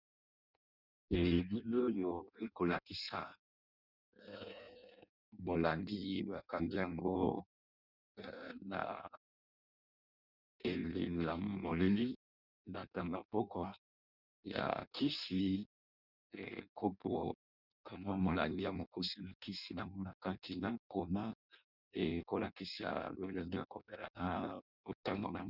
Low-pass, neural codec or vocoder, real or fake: 5.4 kHz; codec, 16 kHz in and 24 kHz out, 0.6 kbps, FireRedTTS-2 codec; fake